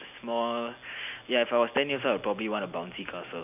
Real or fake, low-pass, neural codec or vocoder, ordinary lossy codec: real; 3.6 kHz; none; none